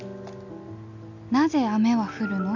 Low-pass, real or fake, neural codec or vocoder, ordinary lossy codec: 7.2 kHz; real; none; none